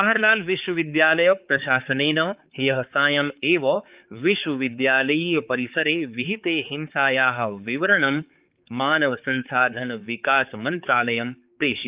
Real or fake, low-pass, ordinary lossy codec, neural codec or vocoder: fake; 3.6 kHz; Opus, 24 kbps; codec, 16 kHz, 4 kbps, X-Codec, HuBERT features, trained on balanced general audio